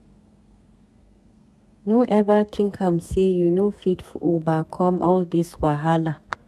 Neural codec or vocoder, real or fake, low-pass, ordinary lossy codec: codec, 32 kHz, 1.9 kbps, SNAC; fake; 14.4 kHz; none